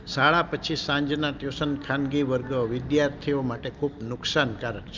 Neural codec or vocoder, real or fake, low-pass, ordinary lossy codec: none; real; 7.2 kHz; Opus, 24 kbps